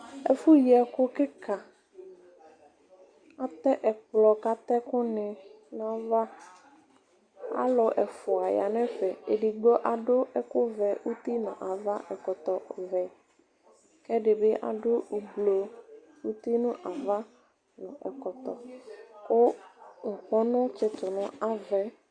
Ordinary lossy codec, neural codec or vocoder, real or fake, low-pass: Opus, 64 kbps; none; real; 9.9 kHz